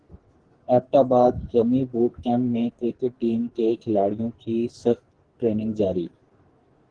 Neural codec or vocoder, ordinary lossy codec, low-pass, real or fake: codec, 44.1 kHz, 2.6 kbps, SNAC; Opus, 16 kbps; 9.9 kHz; fake